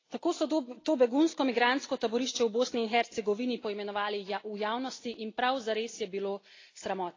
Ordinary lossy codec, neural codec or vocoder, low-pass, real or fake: AAC, 32 kbps; none; 7.2 kHz; real